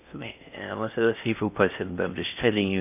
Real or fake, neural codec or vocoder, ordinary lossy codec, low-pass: fake; codec, 16 kHz in and 24 kHz out, 0.6 kbps, FocalCodec, streaming, 2048 codes; none; 3.6 kHz